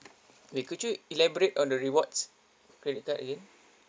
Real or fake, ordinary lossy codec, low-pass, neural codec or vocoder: real; none; none; none